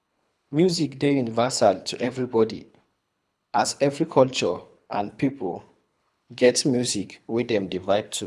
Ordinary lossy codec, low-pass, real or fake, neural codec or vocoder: none; none; fake; codec, 24 kHz, 3 kbps, HILCodec